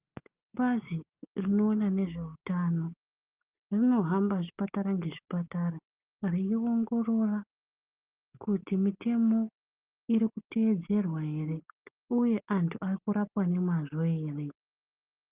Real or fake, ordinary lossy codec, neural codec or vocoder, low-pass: real; Opus, 24 kbps; none; 3.6 kHz